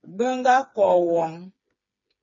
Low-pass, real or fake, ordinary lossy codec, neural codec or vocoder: 7.2 kHz; fake; MP3, 32 kbps; codec, 16 kHz, 4 kbps, FreqCodec, smaller model